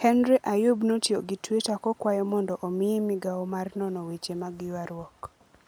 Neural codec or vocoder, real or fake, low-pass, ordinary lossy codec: none; real; none; none